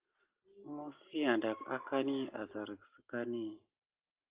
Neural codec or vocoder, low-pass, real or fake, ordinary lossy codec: none; 3.6 kHz; real; Opus, 32 kbps